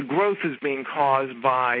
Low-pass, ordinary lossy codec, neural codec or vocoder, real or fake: 5.4 kHz; MP3, 32 kbps; none; real